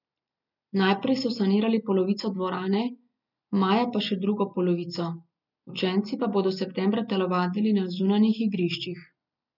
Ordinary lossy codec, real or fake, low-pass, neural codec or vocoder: none; real; 5.4 kHz; none